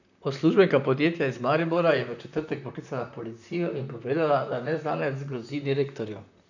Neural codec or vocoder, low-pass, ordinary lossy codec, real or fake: vocoder, 44.1 kHz, 128 mel bands, Pupu-Vocoder; 7.2 kHz; none; fake